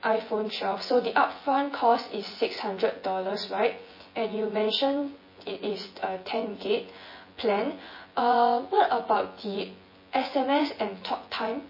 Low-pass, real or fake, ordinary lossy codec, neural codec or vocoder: 5.4 kHz; fake; MP3, 24 kbps; vocoder, 24 kHz, 100 mel bands, Vocos